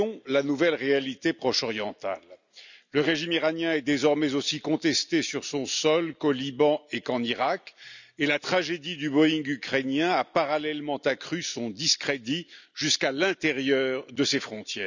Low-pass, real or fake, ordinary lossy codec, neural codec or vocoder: 7.2 kHz; real; none; none